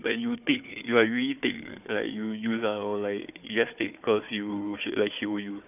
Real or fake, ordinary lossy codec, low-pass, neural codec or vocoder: fake; none; 3.6 kHz; codec, 16 kHz, 4 kbps, FunCodec, trained on Chinese and English, 50 frames a second